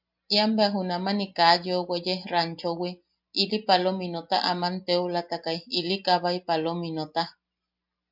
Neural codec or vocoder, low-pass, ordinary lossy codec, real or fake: none; 5.4 kHz; MP3, 48 kbps; real